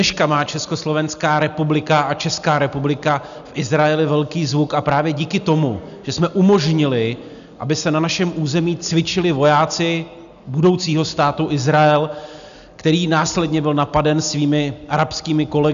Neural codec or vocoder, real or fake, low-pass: none; real; 7.2 kHz